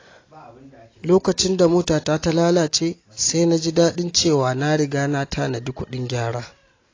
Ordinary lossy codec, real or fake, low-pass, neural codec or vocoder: AAC, 32 kbps; real; 7.2 kHz; none